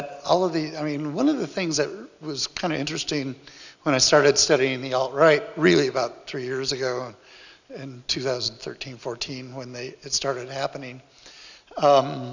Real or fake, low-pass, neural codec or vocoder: real; 7.2 kHz; none